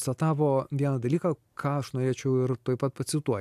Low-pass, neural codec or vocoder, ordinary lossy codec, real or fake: 14.4 kHz; none; AAC, 96 kbps; real